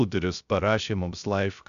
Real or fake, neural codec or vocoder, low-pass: fake; codec, 16 kHz, 0.3 kbps, FocalCodec; 7.2 kHz